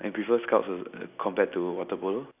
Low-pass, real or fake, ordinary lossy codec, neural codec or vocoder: 3.6 kHz; real; none; none